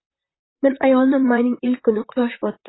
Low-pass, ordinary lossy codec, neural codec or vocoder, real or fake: 7.2 kHz; AAC, 16 kbps; vocoder, 44.1 kHz, 128 mel bands, Pupu-Vocoder; fake